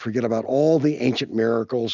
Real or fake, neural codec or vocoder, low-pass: real; none; 7.2 kHz